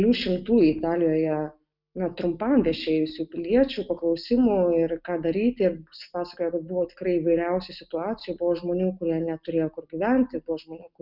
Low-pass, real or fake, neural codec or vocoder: 5.4 kHz; real; none